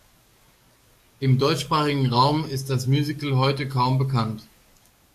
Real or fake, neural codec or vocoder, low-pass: fake; codec, 44.1 kHz, 7.8 kbps, DAC; 14.4 kHz